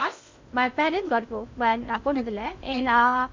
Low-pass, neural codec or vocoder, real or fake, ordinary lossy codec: 7.2 kHz; codec, 16 kHz in and 24 kHz out, 0.6 kbps, FocalCodec, streaming, 2048 codes; fake; MP3, 64 kbps